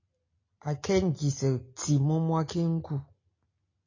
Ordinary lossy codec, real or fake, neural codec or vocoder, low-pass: AAC, 32 kbps; real; none; 7.2 kHz